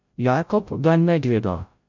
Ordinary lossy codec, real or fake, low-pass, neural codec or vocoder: MP3, 48 kbps; fake; 7.2 kHz; codec, 16 kHz, 0.5 kbps, FreqCodec, larger model